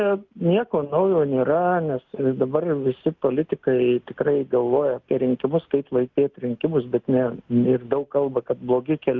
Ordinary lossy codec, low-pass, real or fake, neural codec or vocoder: Opus, 32 kbps; 7.2 kHz; real; none